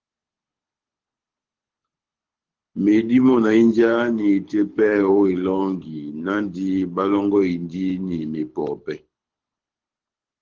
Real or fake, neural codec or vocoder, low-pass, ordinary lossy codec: fake; codec, 24 kHz, 6 kbps, HILCodec; 7.2 kHz; Opus, 16 kbps